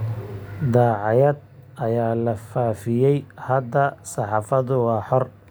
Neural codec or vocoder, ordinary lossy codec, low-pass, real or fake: none; none; none; real